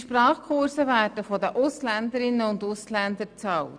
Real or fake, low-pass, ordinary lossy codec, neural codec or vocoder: real; 9.9 kHz; none; none